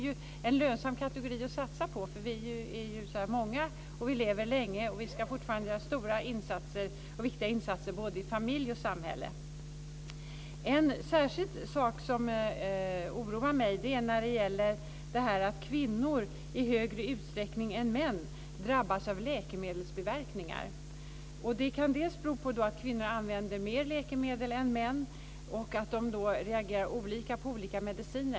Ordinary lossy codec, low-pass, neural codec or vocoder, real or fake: none; none; none; real